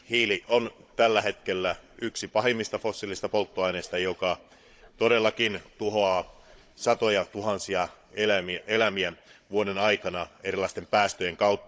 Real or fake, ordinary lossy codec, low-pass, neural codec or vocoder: fake; none; none; codec, 16 kHz, 16 kbps, FunCodec, trained on Chinese and English, 50 frames a second